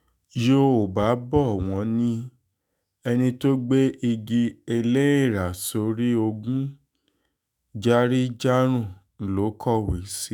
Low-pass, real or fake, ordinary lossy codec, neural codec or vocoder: none; fake; none; autoencoder, 48 kHz, 128 numbers a frame, DAC-VAE, trained on Japanese speech